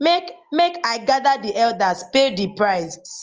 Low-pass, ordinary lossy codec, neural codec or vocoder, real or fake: 7.2 kHz; Opus, 24 kbps; none; real